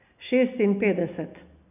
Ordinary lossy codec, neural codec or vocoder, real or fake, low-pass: none; none; real; 3.6 kHz